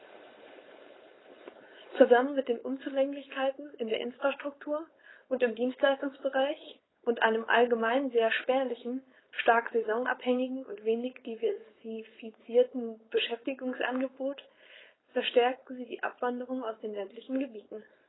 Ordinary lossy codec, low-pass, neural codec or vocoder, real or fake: AAC, 16 kbps; 7.2 kHz; codec, 16 kHz, 4.8 kbps, FACodec; fake